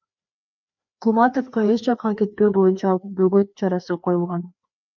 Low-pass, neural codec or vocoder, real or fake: 7.2 kHz; codec, 16 kHz, 2 kbps, FreqCodec, larger model; fake